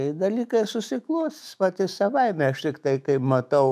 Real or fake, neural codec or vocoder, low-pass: fake; autoencoder, 48 kHz, 128 numbers a frame, DAC-VAE, trained on Japanese speech; 14.4 kHz